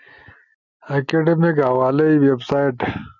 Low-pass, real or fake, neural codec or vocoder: 7.2 kHz; real; none